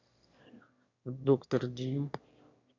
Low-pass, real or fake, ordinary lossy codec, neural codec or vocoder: 7.2 kHz; fake; Opus, 64 kbps; autoencoder, 22.05 kHz, a latent of 192 numbers a frame, VITS, trained on one speaker